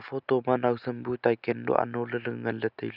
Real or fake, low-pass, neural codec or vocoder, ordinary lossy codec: real; 5.4 kHz; none; none